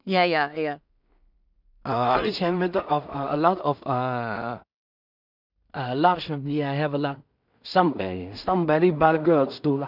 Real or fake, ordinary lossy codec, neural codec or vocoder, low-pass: fake; none; codec, 16 kHz in and 24 kHz out, 0.4 kbps, LongCat-Audio-Codec, two codebook decoder; 5.4 kHz